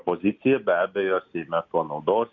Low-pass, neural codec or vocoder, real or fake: 7.2 kHz; none; real